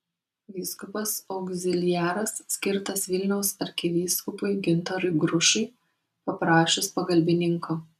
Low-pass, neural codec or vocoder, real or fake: 14.4 kHz; none; real